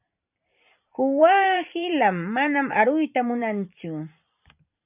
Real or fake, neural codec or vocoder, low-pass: fake; vocoder, 44.1 kHz, 128 mel bands every 512 samples, BigVGAN v2; 3.6 kHz